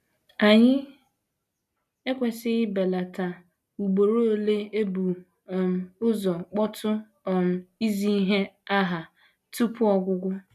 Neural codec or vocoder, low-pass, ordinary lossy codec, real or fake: none; 14.4 kHz; none; real